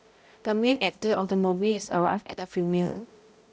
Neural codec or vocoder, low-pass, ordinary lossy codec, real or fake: codec, 16 kHz, 0.5 kbps, X-Codec, HuBERT features, trained on balanced general audio; none; none; fake